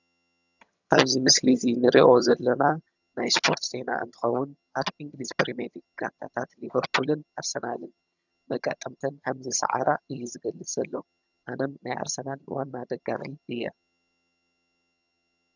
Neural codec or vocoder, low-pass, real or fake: vocoder, 22.05 kHz, 80 mel bands, HiFi-GAN; 7.2 kHz; fake